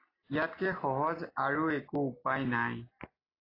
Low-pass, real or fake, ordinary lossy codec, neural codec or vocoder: 5.4 kHz; real; AAC, 24 kbps; none